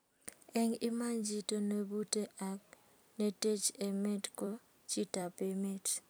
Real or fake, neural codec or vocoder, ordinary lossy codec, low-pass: fake; vocoder, 44.1 kHz, 128 mel bands every 256 samples, BigVGAN v2; none; none